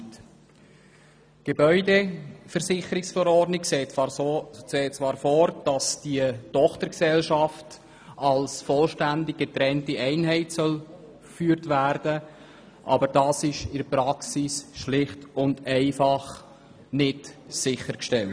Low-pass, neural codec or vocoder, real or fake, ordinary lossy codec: none; none; real; none